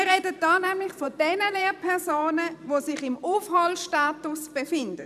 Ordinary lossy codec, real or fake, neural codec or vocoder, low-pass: none; fake; vocoder, 44.1 kHz, 128 mel bands every 512 samples, BigVGAN v2; 14.4 kHz